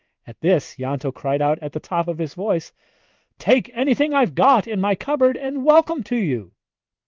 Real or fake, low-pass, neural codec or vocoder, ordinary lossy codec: fake; 7.2 kHz; codec, 16 kHz in and 24 kHz out, 1 kbps, XY-Tokenizer; Opus, 24 kbps